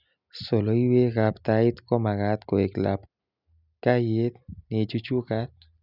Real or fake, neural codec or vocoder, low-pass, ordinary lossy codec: real; none; 5.4 kHz; none